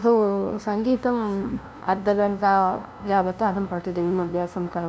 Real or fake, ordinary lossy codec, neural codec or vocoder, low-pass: fake; none; codec, 16 kHz, 0.5 kbps, FunCodec, trained on LibriTTS, 25 frames a second; none